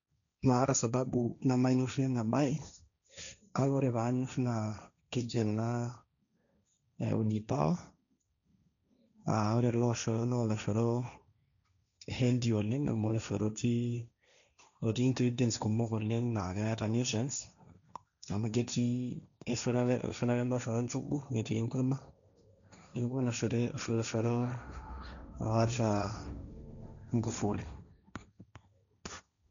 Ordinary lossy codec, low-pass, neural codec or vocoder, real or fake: none; 7.2 kHz; codec, 16 kHz, 1.1 kbps, Voila-Tokenizer; fake